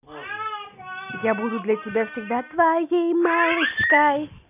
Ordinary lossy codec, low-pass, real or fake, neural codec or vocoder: none; 3.6 kHz; real; none